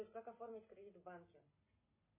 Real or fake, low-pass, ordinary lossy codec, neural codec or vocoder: real; 3.6 kHz; MP3, 16 kbps; none